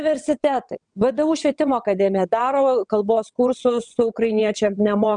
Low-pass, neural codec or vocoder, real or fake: 9.9 kHz; vocoder, 22.05 kHz, 80 mel bands, WaveNeXt; fake